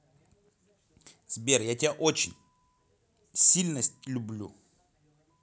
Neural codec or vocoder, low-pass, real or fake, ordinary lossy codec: none; none; real; none